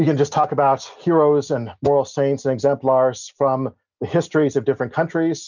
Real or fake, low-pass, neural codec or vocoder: real; 7.2 kHz; none